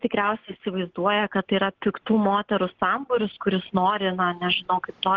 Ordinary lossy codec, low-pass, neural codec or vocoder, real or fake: Opus, 16 kbps; 7.2 kHz; none; real